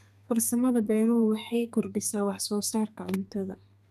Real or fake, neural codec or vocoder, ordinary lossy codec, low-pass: fake; codec, 32 kHz, 1.9 kbps, SNAC; none; 14.4 kHz